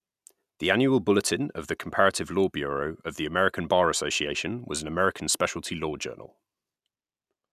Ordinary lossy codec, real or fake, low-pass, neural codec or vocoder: none; real; 14.4 kHz; none